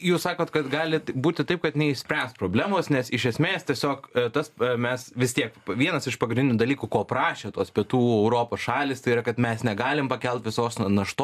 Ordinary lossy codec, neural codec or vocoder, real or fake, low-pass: AAC, 96 kbps; none; real; 14.4 kHz